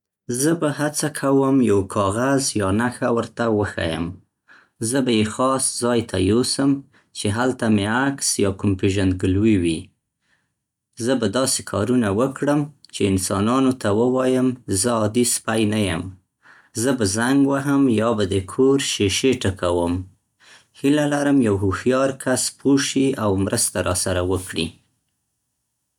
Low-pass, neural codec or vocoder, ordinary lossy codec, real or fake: 19.8 kHz; none; none; real